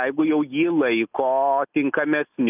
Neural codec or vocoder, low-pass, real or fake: none; 3.6 kHz; real